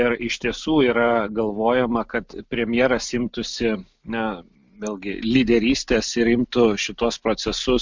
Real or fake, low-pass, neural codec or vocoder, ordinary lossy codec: real; 7.2 kHz; none; MP3, 64 kbps